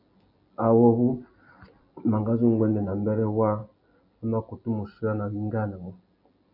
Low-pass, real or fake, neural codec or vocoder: 5.4 kHz; real; none